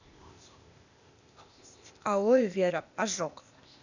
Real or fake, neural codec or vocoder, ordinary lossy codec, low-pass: fake; codec, 16 kHz, 0.8 kbps, ZipCodec; none; 7.2 kHz